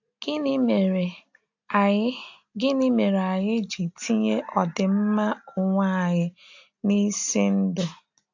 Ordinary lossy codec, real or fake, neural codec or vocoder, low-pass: AAC, 48 kbps; real; none; 7.2 kHz